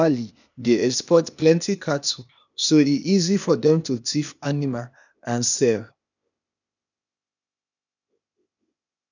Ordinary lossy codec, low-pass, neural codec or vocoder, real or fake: none; 7.2 kHz; codec, 16 kHz, 0.8 kbps, ZipCodec; fake